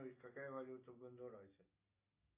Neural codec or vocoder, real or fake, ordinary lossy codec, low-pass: none; real; AAC, 32 kbps; 3.6 kHz